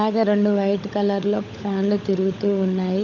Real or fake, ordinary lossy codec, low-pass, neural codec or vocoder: fake; none; 7.2 kHz; codec, 16 kHz, 16 kbps, FunCodec, trained on LibriTTS, 50 frames a second